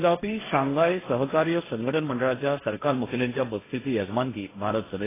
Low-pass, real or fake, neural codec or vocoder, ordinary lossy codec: 3.6 kHz; fake; codec, 16 kHz, 1.1 kbps, Voila-Tokenizer; AAC, 16 kbps